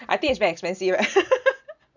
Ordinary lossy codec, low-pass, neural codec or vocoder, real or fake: none; 7.2 kHz; none; real